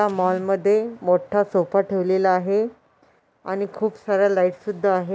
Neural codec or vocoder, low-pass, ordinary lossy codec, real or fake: none; none; none; real